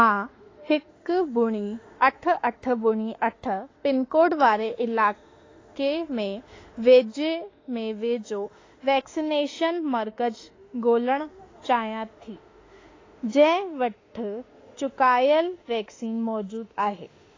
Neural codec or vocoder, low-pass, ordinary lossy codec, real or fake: autoencoder, 48 kHz, 32 numbers a frame, DAC-VAE, trained on Japanese speech; 7.2 kHz; AAC, 32 kbps; fake